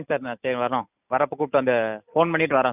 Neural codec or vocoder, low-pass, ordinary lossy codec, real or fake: none; 3.6 kHz; none; real